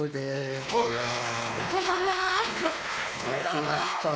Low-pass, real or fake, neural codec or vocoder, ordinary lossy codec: none; fake; codec, 16 kHz, 2 kbps, X-Codec, WavLM features, trained on Multilingual LibriSpeech; none